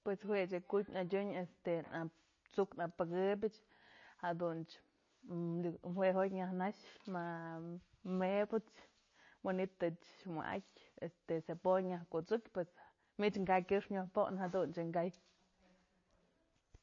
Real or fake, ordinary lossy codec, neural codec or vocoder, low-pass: real; MP3, 32 kbps; none; 7.2 kHz